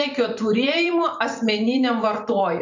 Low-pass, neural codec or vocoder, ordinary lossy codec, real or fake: 7.2 kHz; vocoder, 44.1 kHz, 128 mel bands every 256 samples, BigVGAN v2; MP3, 48 kbps; fake